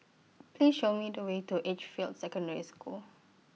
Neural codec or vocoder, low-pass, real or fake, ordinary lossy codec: none; none; real; none